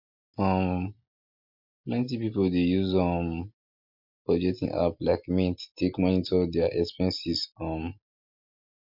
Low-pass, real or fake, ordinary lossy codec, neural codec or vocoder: 5.4 kHz; real; MP3, 48 kbps; none